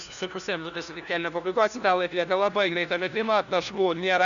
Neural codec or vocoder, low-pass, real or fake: codec, 16 kHz, 1 kbps, FunCodec, trained on LibriTTS, 50 frames a second; 7.2 kHz; fake